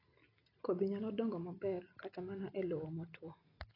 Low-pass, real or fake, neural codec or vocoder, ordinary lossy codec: 5.4 kHz; real; none; AAC, 24 kbps